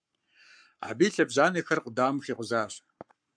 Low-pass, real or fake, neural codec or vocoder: 9.9 kHz; fake; codec, 44.1 kHz, 7.8 kbps, Pupu-Codec